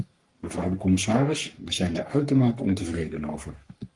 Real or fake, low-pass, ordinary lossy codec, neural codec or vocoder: fake; 10.8 kHz; Opus, 32 kbps; codec, 44.1 kHz, 3.4 kbps, Pupu-Codec